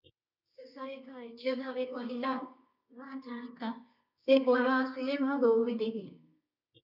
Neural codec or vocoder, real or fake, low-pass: codec, 24 kHz, 0.9 kbps, WavTokenizer, medium music audio release; fake; 5.4 kHz